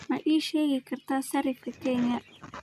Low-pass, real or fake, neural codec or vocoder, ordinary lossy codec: 14.4 kHz; real; none; none